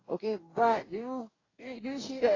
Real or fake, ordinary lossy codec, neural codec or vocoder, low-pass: fake; AAC, 32 kbps; codec, 44.1 kHz, 2.6 kbps, DAC; 7.2 kHz